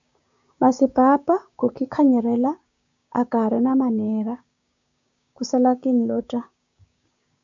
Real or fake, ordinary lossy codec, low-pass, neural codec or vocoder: fake; AAC, 64 kbps; 7.2 kHz; codec, 16 kHz, 6 kbps, DAC